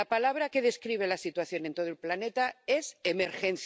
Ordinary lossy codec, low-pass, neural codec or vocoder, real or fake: none; none; none; real